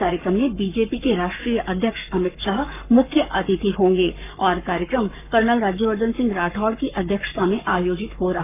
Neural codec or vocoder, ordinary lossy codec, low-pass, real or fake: codec, 44.1 kHz, 7.8 kbps, Pupu-Codec; none; 3.6 kHz; fake